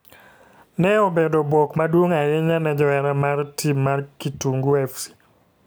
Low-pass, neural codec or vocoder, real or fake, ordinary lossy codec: none; none; real; none